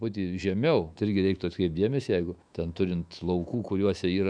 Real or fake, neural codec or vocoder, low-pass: fake; autoencoder, 48 kHz, 128 numbers a frame, DAC-VAE, trained on Japanese speech; 9.9 kHz